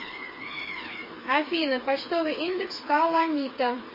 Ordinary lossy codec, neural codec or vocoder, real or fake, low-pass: MP3, 24 kbps; codec, 16 kHz, 4 kbps, FreqCodec, smaller model; fake; 5.4 kHz